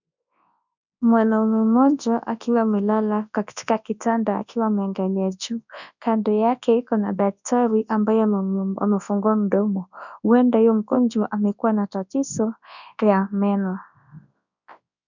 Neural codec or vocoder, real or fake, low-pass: codec, 24 kHz, 0.9 kbps, WavTokenizer, large speech release; fake; 7.2 kHz